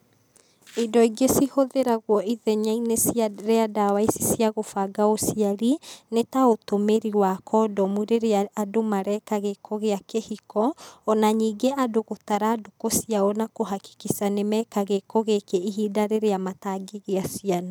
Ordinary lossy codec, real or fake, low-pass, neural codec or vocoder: none; real; none; none